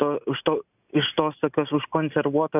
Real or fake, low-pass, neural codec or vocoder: real; 3.6 kHz; none